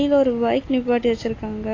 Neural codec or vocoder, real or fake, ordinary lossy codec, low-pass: none; real; AAC, 32 kbps; 7.2 kHz